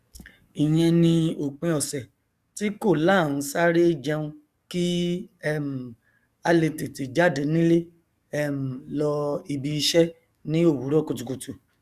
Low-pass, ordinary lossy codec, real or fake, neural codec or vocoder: 14.4 kHz; Opus, 64 kbps; fake; codec, 44.1 kHz, 7.8 kbps, DAC